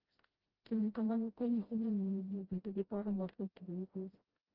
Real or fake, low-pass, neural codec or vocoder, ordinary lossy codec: fake; 5.4 kHz; codec, 16 kHz, 0.5 kbps, FreqCodec, smaller model; Opus, 16 kbps